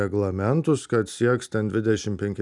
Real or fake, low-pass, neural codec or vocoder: real; 10.8 kHz; none